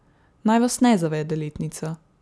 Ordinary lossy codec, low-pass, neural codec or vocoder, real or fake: none; none; none; real